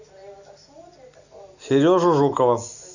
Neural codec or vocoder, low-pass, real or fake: none; 7.2 kHz; real